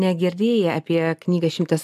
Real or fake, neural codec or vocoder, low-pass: real; none; 14.4 kHz